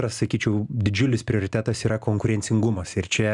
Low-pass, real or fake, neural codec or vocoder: 10.8 kHz; real; none